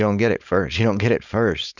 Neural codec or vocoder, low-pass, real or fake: codec, 16 kHz, 4.8 kbps, FACodec; 7.2 kHz; fake